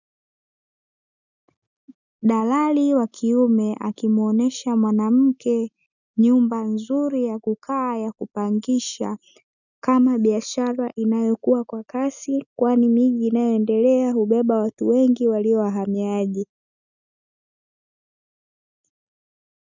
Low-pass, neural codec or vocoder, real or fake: 7.2 kHz; none; real